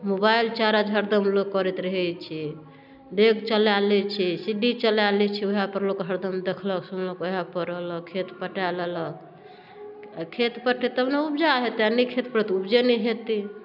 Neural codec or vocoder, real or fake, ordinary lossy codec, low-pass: none; real; none; 5.4 kHz